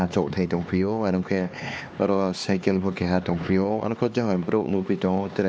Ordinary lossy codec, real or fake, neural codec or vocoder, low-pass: none; fake; codec, 16 kHz, 2 kbps, X-Codec, HuBERT features, trained on LibriSpeech; none